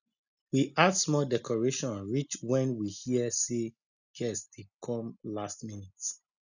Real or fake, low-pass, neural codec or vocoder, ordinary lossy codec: real; 7.2 kHz; none; none